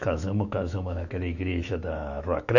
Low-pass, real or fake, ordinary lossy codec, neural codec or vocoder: 7.2 kHz; fake; none; autoencoder, 48 kHz, 128 numbers a frame, DAC-VAE, trained on Japanese speech